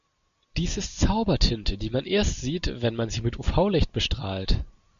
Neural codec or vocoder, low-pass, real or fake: none; 7.2 kHz; real